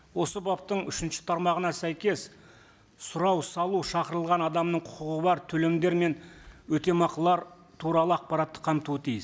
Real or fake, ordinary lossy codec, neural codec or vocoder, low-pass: real; none; none; none